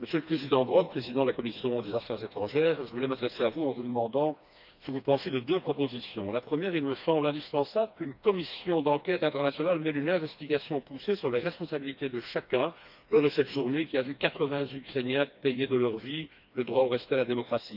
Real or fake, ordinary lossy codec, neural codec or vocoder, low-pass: fake; none; codec, 16 kHz, 2 kbps, FreqCodec, smaller model; 5.4 kHz